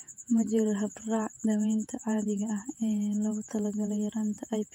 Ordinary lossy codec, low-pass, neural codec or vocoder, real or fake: none; 19.8 kHz; vocoder, 48 kHz, 128 mel bands, Vocos; fake